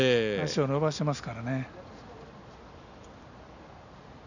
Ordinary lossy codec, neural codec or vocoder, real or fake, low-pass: none; none; real; 7.2 kHz